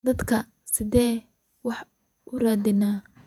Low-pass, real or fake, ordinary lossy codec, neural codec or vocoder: 19.8 kHz; fake; none; vocoder, 48 kHz, 128 mel bands, Vocos